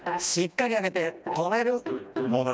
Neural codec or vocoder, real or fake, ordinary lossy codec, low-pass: codec, 16 kHz, 1 kbps, FreqCodec, smaller model; fake; none; none